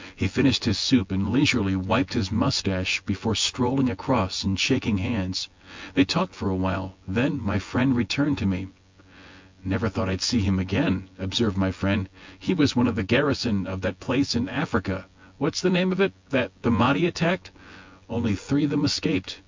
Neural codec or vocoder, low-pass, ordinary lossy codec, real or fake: vocoder, 24 kHz, 100 mel bands, Vocos; 7.2 kHz; MP3, 64 kbps; fake